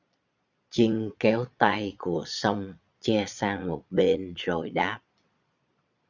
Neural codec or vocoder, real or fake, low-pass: vocoder, 22.05 kHz, 80 mel bands, Vocos; fake; 7.2 kHz